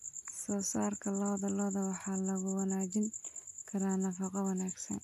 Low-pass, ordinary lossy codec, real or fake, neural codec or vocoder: 14.4 kHz; AAC, 96 kbps; real; none